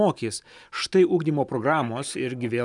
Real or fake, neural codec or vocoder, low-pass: real; none; 10.8 kHz